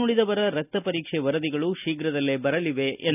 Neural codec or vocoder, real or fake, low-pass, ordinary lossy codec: none; real; 3.6 kHz; none